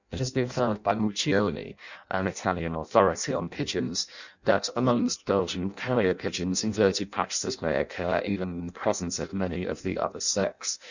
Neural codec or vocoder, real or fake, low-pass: codec, 16 kHz in and 24 kHz out, 0.6 kbps, FireRedTTS-2 codec; fake; 7.2 kHz